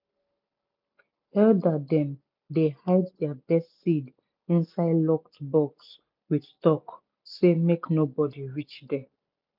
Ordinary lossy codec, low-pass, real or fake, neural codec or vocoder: MP3, 48 kbps; 5.4 kHz; real; none